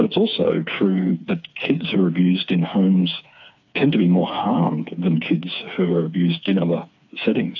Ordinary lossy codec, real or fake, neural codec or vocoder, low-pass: AAC, 48 kbps; fake; codec, 16 kHz, 4 kbps, FreqCodec, smaller model; 7.2 kHz